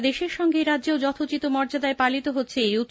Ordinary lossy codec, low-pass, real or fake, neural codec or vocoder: none; none; real; none